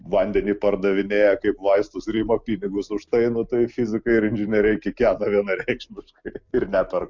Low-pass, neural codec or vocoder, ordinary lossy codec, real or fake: 7.2 kHz; none; MP3, 64 kbps; real